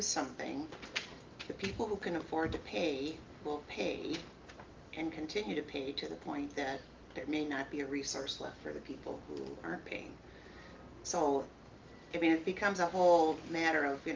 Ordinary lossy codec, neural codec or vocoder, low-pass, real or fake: Opus, 32 kbps; none; 7.2 kHz; real